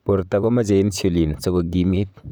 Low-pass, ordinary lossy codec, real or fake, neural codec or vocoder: none; none; fake; vocoder, 44.1 kHz, 128 mel bands, Pupu-Vocoder